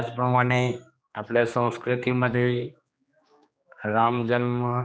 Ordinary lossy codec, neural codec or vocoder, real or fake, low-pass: none; codec, 16 kHz, 2 kbps, X-Codec, HuBERT features, trained on general audio; fake; none